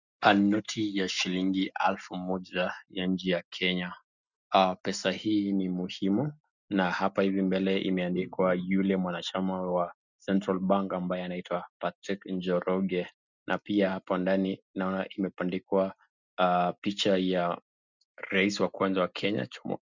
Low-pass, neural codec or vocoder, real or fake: 7.2 kHz; none; real